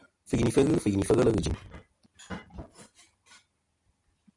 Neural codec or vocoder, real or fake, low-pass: none; real; 10.8 kHz